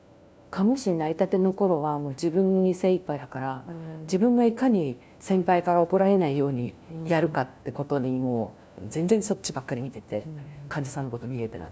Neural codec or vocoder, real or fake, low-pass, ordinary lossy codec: codec, 16 kHz, 1 kbps, FunCodec, trained on LibriTTS, 50 frames a second; fake; none; none